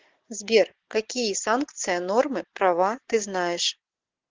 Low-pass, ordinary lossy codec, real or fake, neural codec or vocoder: 7.2 kHz; Opus, 16 kbps; real; none